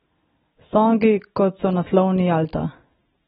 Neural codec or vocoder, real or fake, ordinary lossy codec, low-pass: none; real; AAC, 16 kbps; 14.4 kHz